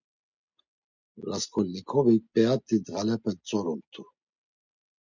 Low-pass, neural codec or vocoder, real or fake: 7.2 kHz; none; real